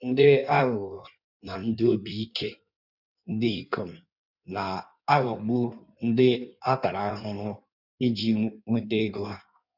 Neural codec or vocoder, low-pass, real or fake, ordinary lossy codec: codec, 16 kHz in and 24 kHz out, 1.1 kbps, FireRedTTS-2 codec; 5.4 kHz; fake; none